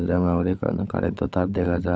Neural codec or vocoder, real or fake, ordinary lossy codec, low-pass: codec, 16 kHz, 16 kbps, FunCodec, trained on LibriTTS, 50 frames a second; fake; none; none